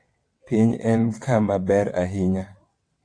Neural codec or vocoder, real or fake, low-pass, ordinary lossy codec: vocoder, 22.05 kHz, 80 mel bands, WaveNeXt; fake; 9.9 kHz; AAC, 48 kbps